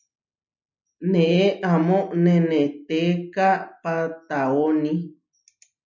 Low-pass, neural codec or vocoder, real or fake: 7.2 kHz; none; real